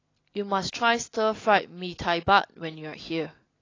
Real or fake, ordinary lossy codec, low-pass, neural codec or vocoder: real; AAC, 32 kbps; 7.2 kHz; none